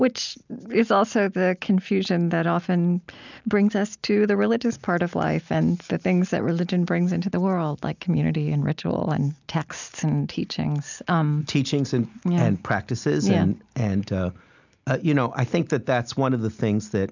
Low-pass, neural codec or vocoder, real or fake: 7.2 kHz; none; real